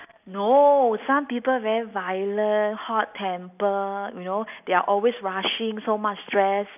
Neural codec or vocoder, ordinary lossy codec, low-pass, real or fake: none; none; 3.6 kHz; real